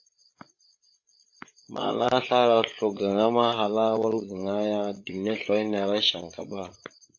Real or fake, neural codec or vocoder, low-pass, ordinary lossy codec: fake; codec, 16 kHz, 8 kbps, FreqCodec, larger model; 7.2 kHz; AAC, 48 kbps